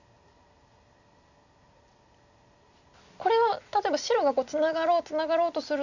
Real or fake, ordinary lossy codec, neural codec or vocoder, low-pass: real; none; none; 7.2 kHz